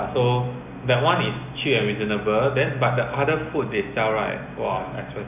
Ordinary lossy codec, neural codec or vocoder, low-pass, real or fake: none; none; 3.6 kHz; real